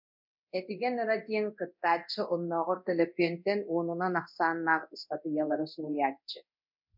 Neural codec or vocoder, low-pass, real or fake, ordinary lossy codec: codec, 24 kHz, 0.9 kbps, DualCodec; 5.4 kHz; fake; MP3, 48 kbps